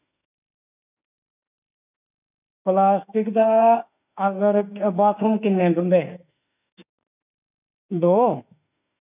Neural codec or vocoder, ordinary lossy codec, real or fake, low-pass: autoencoder, 48 kHz, 32 numbers a frame, DAC-VAE, trained on Japanese speech; none; fake; 3.6 kHz